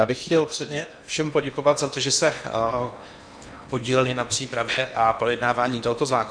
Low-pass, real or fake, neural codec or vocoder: 9.9 kHz; fake; codec, 16 kHz in and 24 kHz out, 0.8 kbps, FocalCodec, streaming, 65536 codes